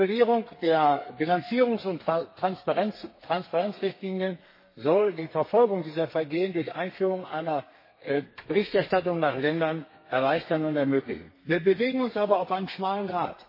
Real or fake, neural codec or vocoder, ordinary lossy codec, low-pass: fake; codec, 32 kHz, 1.9 kbps, SNAC; MP3, 24 kbps; 5.4 kHz